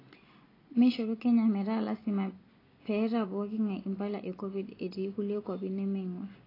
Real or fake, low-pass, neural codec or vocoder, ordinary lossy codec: real; 5.4 kHz; none; AAC, 24 kbps